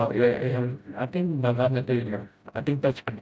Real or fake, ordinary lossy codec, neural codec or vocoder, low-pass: fake; none; codec, 16 kHz, 0.5 kbps, FreqCodec, smaller model; none